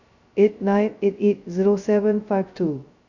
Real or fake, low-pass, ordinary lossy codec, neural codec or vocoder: fake; 7.2 kHz; none; codec, 16 kHz, 0.2 kbps, FocalCodec